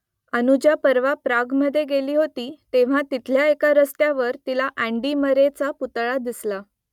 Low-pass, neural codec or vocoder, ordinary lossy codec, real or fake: 19.8 kHz; none; none; real